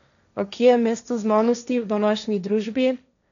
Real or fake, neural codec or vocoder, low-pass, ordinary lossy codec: fake; codec, 16 kHz, 1.1 kbps, Voila-Tokenizer; 7.2 kHz; none